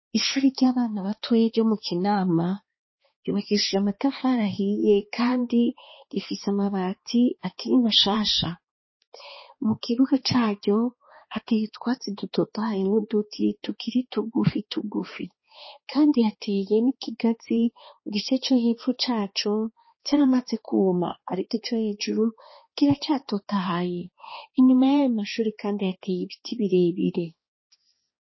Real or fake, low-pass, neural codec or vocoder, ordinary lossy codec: fake; 7.2 kHz; codec, 16 kHz, 2 kbps, X-Codec, HuBERT features, trained on balanced general audio; MP3, 24 kbps